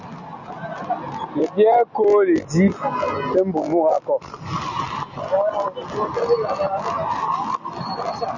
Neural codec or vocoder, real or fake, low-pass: none; real; 7.2 kHz